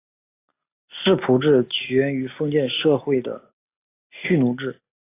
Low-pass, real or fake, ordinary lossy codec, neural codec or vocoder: 3.6 kHz; real; AAC, 24 kbps; none